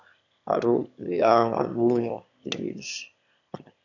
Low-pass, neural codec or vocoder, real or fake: 7.2 kHz; autoencoder, 22.05 kHz, a latent of 192 numbers a frame, VITS, trained on one speaker; fake